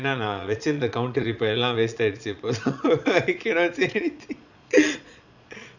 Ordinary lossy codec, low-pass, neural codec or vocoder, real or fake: none; 7.2 kHz; vocoder, 22.05 kHz, 80 mel bands, Vocos; fake